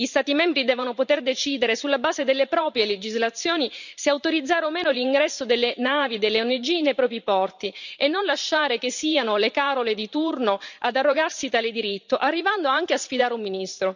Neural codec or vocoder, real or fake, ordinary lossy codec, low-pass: vocoder, 44.1 kHz, 128 mel bands every 512 samples, BigVGAN v2; fake; none; 7.2 kHz